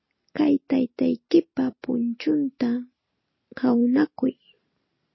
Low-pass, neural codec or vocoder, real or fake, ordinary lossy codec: 7.2 kHz; none; real; MP3, 24 kbps